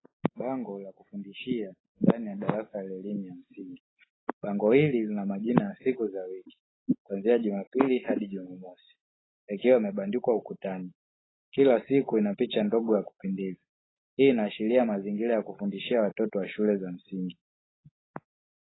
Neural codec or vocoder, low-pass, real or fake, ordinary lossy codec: none; 7.2 kHz; real; AAC, 16 kbps